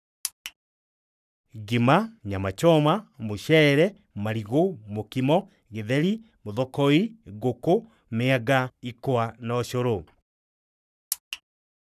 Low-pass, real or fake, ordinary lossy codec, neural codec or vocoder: 14.4 kHz; fake; none; codec, 44.1 kHz, 7.8 kbps, Pupu-Codec